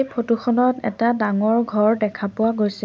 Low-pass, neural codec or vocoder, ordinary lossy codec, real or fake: none; none; none; real